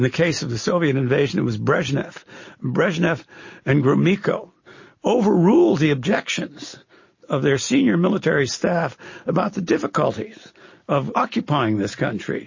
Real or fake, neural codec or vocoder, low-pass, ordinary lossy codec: real; none; 7.2 kHz; MP3, 32 kbps